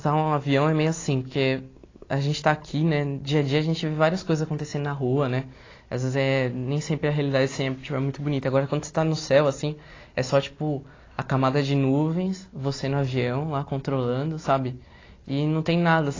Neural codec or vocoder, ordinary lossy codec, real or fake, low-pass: none; AAC, 32 kbps; real; 7.2 kHz